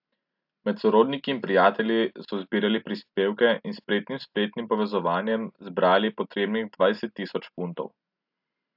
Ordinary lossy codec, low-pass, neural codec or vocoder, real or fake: AAC, 48 kbps; 5.4 kHz; none; real